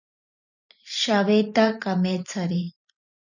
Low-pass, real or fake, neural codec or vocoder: 7.2 kHz; fake; vocoder, 44.1 kHz, 128 mel bands every 512 samples, BigVGAN v2